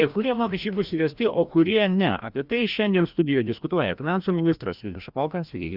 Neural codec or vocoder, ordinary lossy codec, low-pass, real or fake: codec, 16 kHz, 1 kbps, FreqCodec, larger model; Opus, 64 kbps; 5.4 kHz; fake